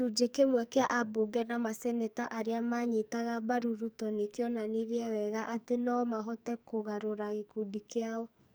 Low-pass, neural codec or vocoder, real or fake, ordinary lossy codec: none; codec, 44.1 kHz, 2.6 kbps, SNAC; fake; none